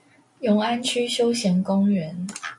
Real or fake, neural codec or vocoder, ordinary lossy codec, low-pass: real; none; MP3, 48 kbps; 10.8 kHz